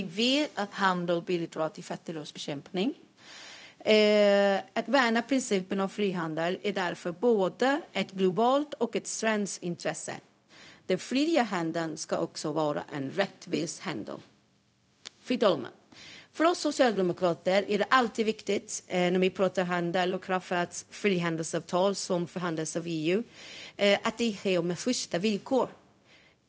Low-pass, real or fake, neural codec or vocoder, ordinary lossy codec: none; fake; codec, 16 kHz, 0.4 kbps, LongCat-Audio-Codec; none